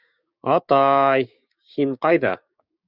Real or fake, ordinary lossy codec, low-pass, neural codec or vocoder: fake; Opus, 64 kbps; 5.4 kHz; codec, 16 kHz, 6 kbps, DAC